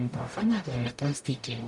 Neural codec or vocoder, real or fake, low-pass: codec, 44.1 kHz, 0.9 kbps, DAC; fake; 10.8 kHz